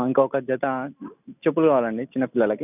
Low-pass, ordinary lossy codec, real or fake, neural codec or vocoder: 3.6 kHz; none; real; none